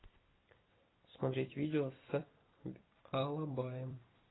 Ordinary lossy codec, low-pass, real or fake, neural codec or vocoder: AAC, 16 kbps; 7.2 kHz; fake; vocoder, 44.1 kHz, 128 mel bands every 256 samples, BigVGAN v2